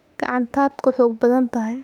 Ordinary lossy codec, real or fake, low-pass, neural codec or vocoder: none; fake; 19.8 kHz; autoencoder, 48 kHz, 32 numbers a frame, DAC-VAE, trained on Japanese speech